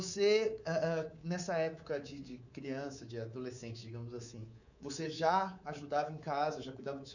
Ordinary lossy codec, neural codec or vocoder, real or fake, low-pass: none; codec, 24 kHz, 3.1 kbps, DualCodec; fake; 7.2 kHz